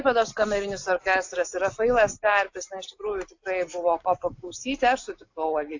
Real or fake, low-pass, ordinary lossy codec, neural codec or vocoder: real; 7.2 kHz; AAC, 48 kbps; none